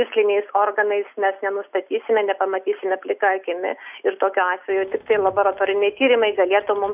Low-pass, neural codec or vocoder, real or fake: 3.6 kHz; none; real